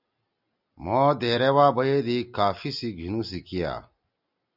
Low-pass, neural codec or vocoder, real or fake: 5.4 kHz; none; real